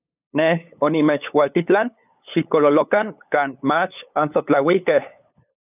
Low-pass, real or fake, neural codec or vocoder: 3.6 kHz; fake; codec, 16 kHz, 8 kbps, FunCodec, trained on LibriTTS, 25 frames a second